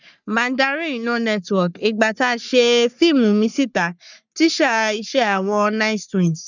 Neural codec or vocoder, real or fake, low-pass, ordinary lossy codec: codec, 44.1 kHz, 3.4 kbps, Pupu-Codec; fake; 7.2 kHz; none